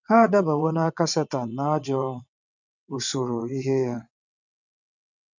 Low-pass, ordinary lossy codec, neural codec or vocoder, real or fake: 7.2 kHz; none; vocoder, 22.05 kHz, 80 mel bands, WaveNeXt; fake